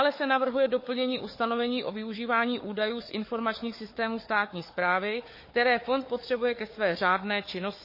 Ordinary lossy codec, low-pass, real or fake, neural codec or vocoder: MP3, 24 kbps; 5.4 kHz; fake; codec, 16 kHz, 4 kbps, FunCodec, trained on Chinese and English, 50 frames a second